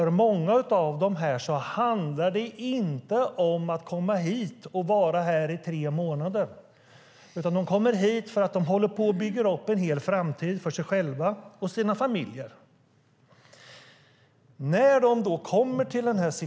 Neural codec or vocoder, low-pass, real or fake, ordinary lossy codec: none; none; real; none